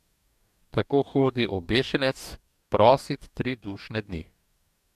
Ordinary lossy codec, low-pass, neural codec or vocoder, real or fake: none; 14.4 kHz; codec, 44.1 kHz, 2.6 kbps, DAC; fake